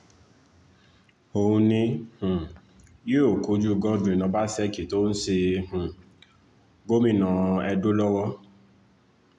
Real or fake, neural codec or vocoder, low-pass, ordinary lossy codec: real; none; 10.8 kHz; none